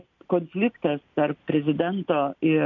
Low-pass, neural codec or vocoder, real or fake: 7.2 kHz; none; real